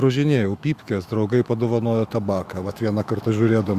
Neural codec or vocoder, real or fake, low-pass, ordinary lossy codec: autoencoder, 48 kHz, 128 numbers a frame, DAC-VAE, trained on Japanese speech; fake; 14.4 kHz; Opus, 64 kbps